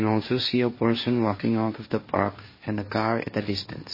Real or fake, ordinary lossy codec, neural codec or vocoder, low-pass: fake; MP3, 24 kbps; codec, 16 kHz, 1.1 kbps, Voila-Tokenizer; 5.4 kHz